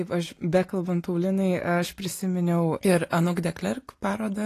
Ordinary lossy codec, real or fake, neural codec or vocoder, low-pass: AAC, 48 kbps; real; none; 14.4 kHz